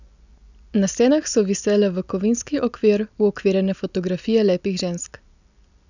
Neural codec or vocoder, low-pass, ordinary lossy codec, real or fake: none; 7.2 kHz; none; real